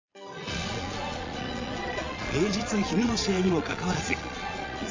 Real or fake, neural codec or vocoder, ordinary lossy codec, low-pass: fake; vocoder, 22.05 kHz, 80 mel bands, Vocos; MP3, 48 kbps; 7.2 kHz